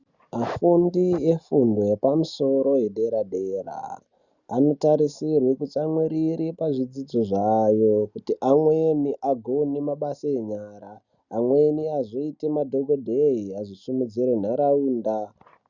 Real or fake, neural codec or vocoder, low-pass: real; none; 7.2 kHz